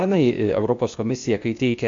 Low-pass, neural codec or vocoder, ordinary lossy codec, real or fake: 7.2 kHz; codec, 16 kHz, 0.8 kbps, ZipCodec; MP3, 48 kbps; fake